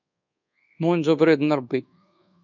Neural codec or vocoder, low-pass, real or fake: codec, 24 kHz, 1.2 kbps, DualCodec; 7.2 kHz; fake